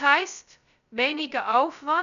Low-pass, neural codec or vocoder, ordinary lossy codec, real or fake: 7.2 kHz; codec, 16 kHz, 0.2 kbps, FocalCodec; none; fake